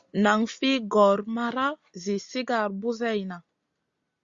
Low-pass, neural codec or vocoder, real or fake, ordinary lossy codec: 7.2 kHz; none; real; Opus, 64 kbps